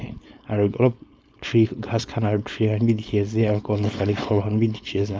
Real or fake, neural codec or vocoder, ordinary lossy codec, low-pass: fake; codec, 16 kHz, 4.8 kbps, FACodec; none; none